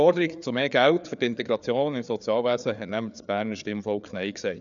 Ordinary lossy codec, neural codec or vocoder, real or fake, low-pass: none; codec, 16 kHz, 4 kbps, FreqCodec, larger model; fake; 7.2 kHz